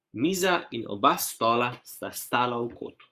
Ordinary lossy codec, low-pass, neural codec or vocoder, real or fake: Opus, 64 kbps; 14.4 kHz; codec, 44.1 kHz, 7.8 kbps, Pupu-Codec; fake